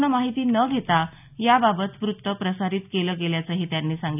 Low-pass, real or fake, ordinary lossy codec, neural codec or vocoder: 3.6 kHz; real; none; none